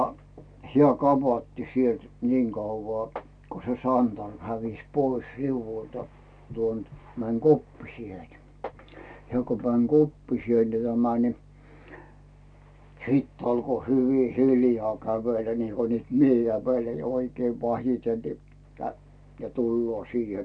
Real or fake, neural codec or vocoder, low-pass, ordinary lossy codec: real; none; 9.9 kHz; none